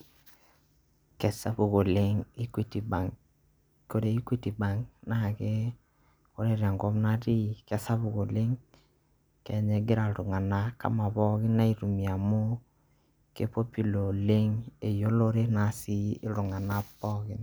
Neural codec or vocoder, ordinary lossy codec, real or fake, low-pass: none; none; real; none